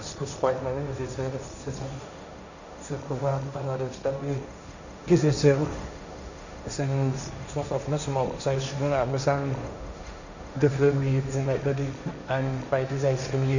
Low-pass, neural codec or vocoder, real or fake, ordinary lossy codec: 7.2 kHz; codec, 16 kHz, 1.1 kbps, Voila-Tokenizer; fake; none